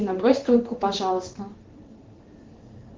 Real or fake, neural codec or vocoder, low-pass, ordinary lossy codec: fake; codec, 16 kHz in and 24 kHz out, 1 kbps, XY-Tokenizer; 7.2 kHz; Opus, 16 kbps